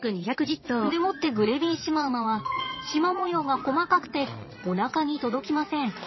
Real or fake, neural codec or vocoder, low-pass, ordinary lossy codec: fake; vocoder, 22.05 kHz, 80 mel bands, Vocos; 7.2 kHz; MP3, 24 kbps